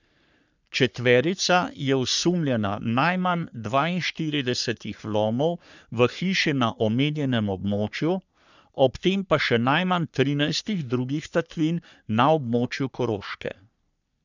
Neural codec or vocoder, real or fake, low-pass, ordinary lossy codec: codec, 44.1 kHz, 3.4 kbps, Pupu-Codec; fake; 7.2 kHz; none